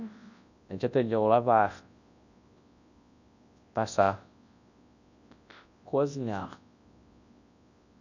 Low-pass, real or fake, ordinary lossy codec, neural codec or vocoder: 7.2 kHz; fake; none; codec, 24 kHz, 0.9 kbps, WavTokenizer, large speech release